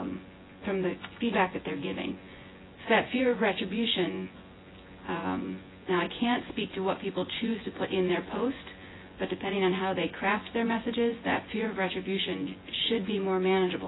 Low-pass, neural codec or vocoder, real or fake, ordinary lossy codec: 7.2 kHz; vocoder, 24 kHz, 100 mel bands, Vocos; fake; AAC, 16 kbps